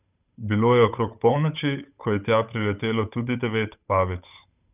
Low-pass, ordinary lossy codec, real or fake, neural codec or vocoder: 3.6 kHz; none; fake; codec, 16 kHz, 8 kbps, FunCodec, trained on Chinese and English, 25 frames a second